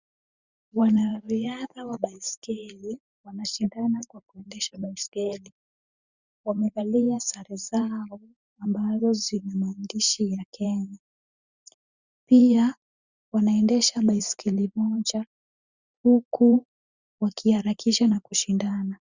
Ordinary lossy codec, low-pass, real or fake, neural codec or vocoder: Opus, 64 kbps; 7.2 kHz; fake; vocoder, 44.1 kHz, 128 mel bands every 512 samples, BigVGAN v2